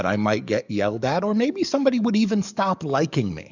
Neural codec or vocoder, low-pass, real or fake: codec, 44.1 kHz, 7.8 kbps, DAC; 7.2 kHz; fake